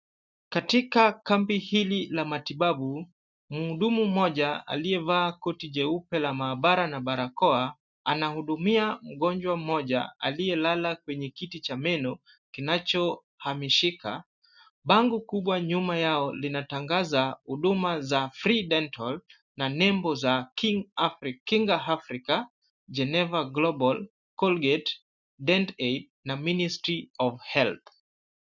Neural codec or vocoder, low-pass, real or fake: none; 7.2 kHz; real